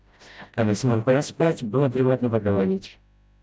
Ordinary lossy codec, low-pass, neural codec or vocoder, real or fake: none; none; codec, 16 kHz, 0.5 kbps, FreqCodec, smaller model; fake